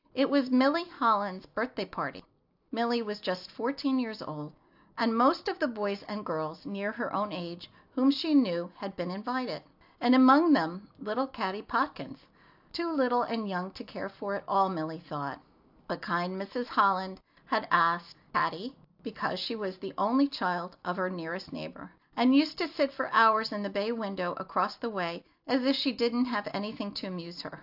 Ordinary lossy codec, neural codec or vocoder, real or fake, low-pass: AAC, 48 kbps; none; real; 5.4 kHz